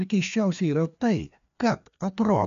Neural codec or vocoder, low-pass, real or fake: codec, 16 kHz, 2 kbps, FreqCodec, larger model; 7.2 kHz; fake